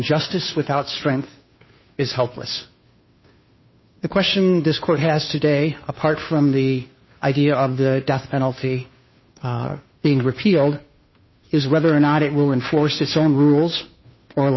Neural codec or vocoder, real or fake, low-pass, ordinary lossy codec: codec, 16 kHz, 2 kbps, FunCodec, trained on Chinese and English, 25 frames a second; fake; 7.2 kHz; MP3, 24 kbps